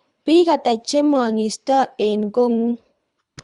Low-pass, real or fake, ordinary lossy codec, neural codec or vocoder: 10.8 kHz; fake; Opus, 64 kbps; codec, 24 kHz, 3 kbps, HILCodec